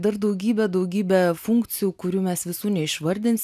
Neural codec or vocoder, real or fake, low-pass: none; real; 14.4 kHz